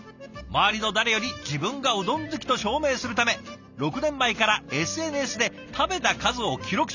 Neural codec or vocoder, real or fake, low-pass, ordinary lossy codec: none; real; 7.2 kHz; none